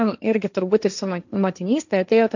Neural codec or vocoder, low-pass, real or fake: codec, 16 kHz, 1.1 kbps, Voila-Tokenizer; 7.2 kHz; fake